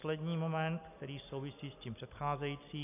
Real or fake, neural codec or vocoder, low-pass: real; none; 3.6 kHz